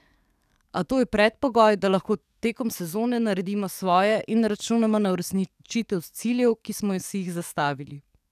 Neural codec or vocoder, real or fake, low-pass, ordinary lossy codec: codec, 44.1 kHz, 7.8 kbps, DAC; fake; 14.4 kHz; none